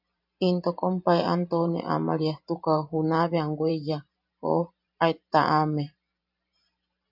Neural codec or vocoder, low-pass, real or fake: vocoder, 44.1 kHz, 128 mel bands every 512 samples, BigVGAN v2; 5.4 kHz; fake